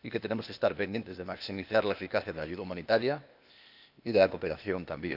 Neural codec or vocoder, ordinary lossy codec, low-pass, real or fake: codec, 16 kHz, 0.8 kbps, ZipCodec; none; 5.4 kHz; fake